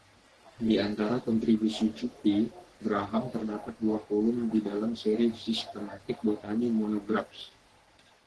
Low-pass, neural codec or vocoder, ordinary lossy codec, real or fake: 10.8 kHz; codec, 44.1 kHz, 3.4 kbps, Pupu-Codec; Opus, 16 kbps; fake